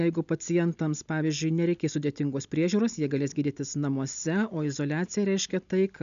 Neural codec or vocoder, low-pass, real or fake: none; 7.2 kHz; real